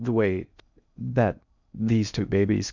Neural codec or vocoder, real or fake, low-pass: codec, 16 kHz in and 24 kHz out, 0.6 kbps, FocalCodec, streaming, 4096 codes; fake; 7.2 kHz